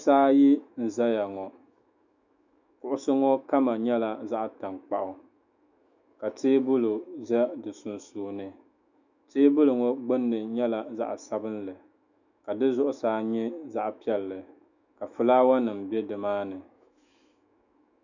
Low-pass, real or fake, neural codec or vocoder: 7.2 kHz; fake; autoencoder, 48 kHz, 128 numbers a frame, DAC-VAE, trained on Japanese speech